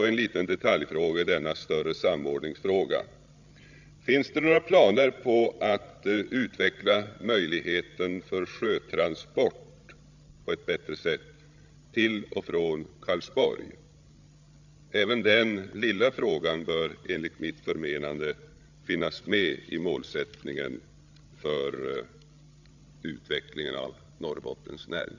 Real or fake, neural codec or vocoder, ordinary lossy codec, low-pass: fake; codec, 16 kHz, 16 kbps, FreqCodec, larger model; none; 7.2 kHz